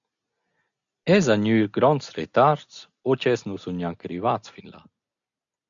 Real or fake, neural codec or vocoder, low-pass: real; none; 7.2 kHz